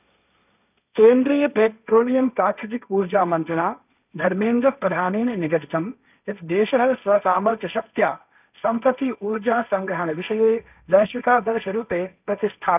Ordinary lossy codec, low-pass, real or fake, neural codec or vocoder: none; 3.6 kHz; fake; codec, 16 kHz, 1.1 kbps, Voila-Tokenizer